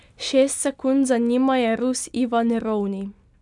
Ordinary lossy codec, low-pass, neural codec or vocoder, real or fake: none; 10.8 kHz; none; real